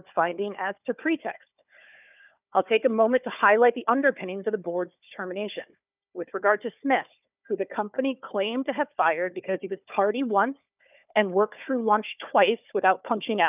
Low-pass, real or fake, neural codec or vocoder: 3.6 kHz; fake; codec, 16 kHz, 4 kbps, FunCodec, trained on Chinese and English, 50 frames a second